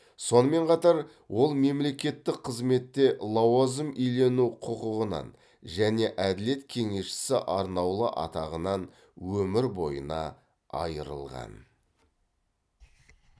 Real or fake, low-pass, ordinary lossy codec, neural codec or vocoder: real; none; none; none